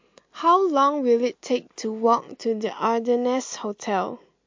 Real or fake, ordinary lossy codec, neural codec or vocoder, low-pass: real; MP3, 48 kbps; none; 7.2 kHz